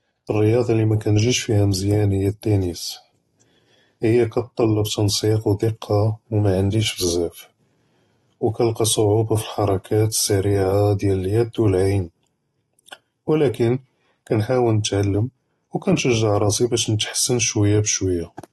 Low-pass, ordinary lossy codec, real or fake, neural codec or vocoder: 19.8 kHz; AAC, 32 kbps; real; none